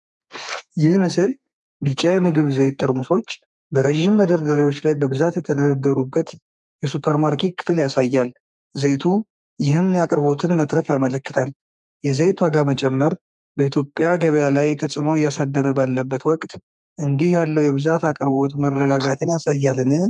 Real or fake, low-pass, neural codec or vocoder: fake; 10.8 kHz; codec, 32 kHz, 1.9 kbps, SNAC